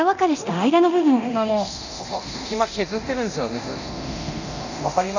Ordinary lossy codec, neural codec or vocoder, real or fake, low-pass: none; codec, 24 kHz, 0.9 kbps, DualCodec; fake; 7.2 kHz